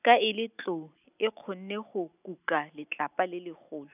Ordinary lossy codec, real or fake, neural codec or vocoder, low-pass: none; real; none; 3.6 kHz